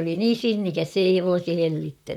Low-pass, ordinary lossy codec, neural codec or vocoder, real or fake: 19.8 kHz; none; vocoder, 44.1 kHz, 128 mel bands, Pupu-Vocoder; fake